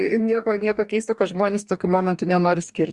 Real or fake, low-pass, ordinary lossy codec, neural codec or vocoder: fake; 10.8 kHz; Opus, 64 kbps; codec, 44.1 kHz, 2.6 kbps, DAC